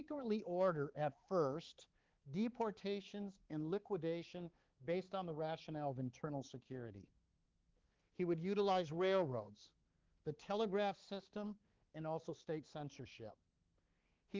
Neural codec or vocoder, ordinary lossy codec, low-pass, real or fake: codec, 16 kHz, 4 kbps, X-Codec, HuBERT features, trained on balanced general audio; Opus, 16 kbps; 7.2 kHz; fake